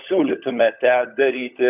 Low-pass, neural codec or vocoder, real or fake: 3.6 kHz; codec, 16 kHz, 16 kbps, FunCodec, trained on LibriTTS, 50 frames a second; fake